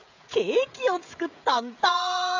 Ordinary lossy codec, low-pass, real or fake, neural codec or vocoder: AAC, 48 kbps; 7.2 kHz; fake; codec, 16 kHz, 16 kbps, FreqCodec, smaller model